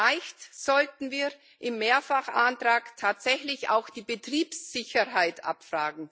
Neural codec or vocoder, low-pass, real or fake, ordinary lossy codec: none; none; real; none